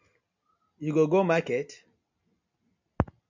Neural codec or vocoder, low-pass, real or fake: none; 7.2 kHz; real